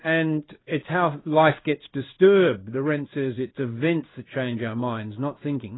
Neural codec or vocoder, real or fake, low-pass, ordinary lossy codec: codec, 16 kHz in and 24 kHz out, 1 kbps, XY-Tokenizer; fake; 7.2 kHz; AAC, 16 kbps